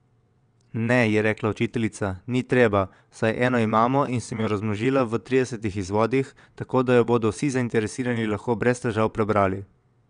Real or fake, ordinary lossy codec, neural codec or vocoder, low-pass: fake; none; vocoder, 22.05 kHz, 80 mel bands, WaveNeXt; 9.9 kHz